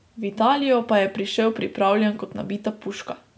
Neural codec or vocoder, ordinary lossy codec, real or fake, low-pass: none; none; real; none